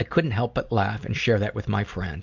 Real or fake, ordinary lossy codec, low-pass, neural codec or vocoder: real; MP3, 64 kbps; 7.2 kHz; none